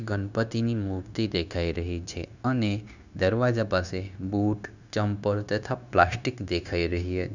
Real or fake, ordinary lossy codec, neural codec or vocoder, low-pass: fake; none; codec, 16 kHz, 0.9 kbps, LongCat-Audio-Codec; 7.2 kHz